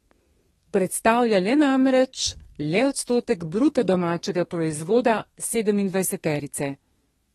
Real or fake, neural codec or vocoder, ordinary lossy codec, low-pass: fake; codec, 32 kHz, 1.9 kbps, SNAC; AAC, 32 kbps; 14.4 kHz